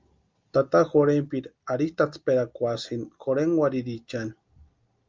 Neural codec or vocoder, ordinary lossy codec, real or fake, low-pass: none; Opus, 32 kbps; real; 7.2 kHz